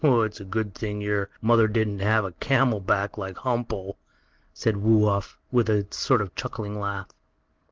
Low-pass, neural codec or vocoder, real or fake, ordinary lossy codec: 7.2 kHz; none; real; Opus, 16 kbps